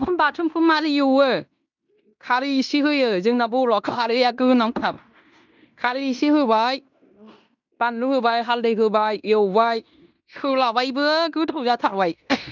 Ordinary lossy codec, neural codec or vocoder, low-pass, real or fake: none; codec, 16 kHz in and 24 kHz out, 0.9 kbps, LongCat-Audio-Codec, fine tuned four codebook decoder; 7.2 kHz; fake